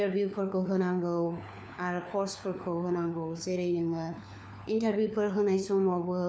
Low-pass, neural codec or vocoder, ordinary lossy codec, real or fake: none; codec, 16 kHz, 4 kbps, FunCodec, trained on LibriTTS, 50 frames a second; none; fake